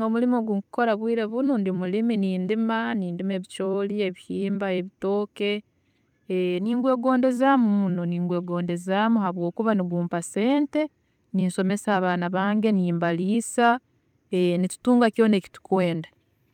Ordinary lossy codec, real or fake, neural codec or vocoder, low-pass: none; fake; vocoder, 44.1 kHz, 128 mel bands every 256 samples, BigVGAN v2; 19.8 kHz